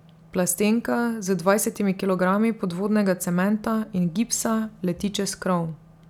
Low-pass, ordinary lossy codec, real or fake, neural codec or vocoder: 19.8 kHz; none; real; none